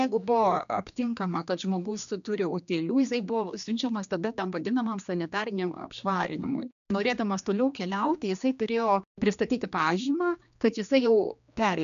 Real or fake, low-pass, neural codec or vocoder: fake; 7.2 kHz; codec, 16 kHz, 2 kbps, X-Codec, HuBERT features, trained on general audio